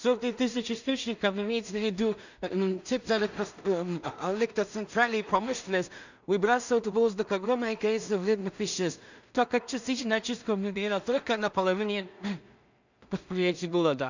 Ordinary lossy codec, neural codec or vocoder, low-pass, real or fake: none; codec, 16 kHz in and 24 kHz out, 0.4 kbps, LongCat-Audio-Codec, two codebook decoder; 7.2 kHz; fake